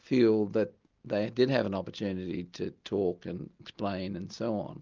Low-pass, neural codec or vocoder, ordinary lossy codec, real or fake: 7.2 kHz; none; Opus, 24 kbps; real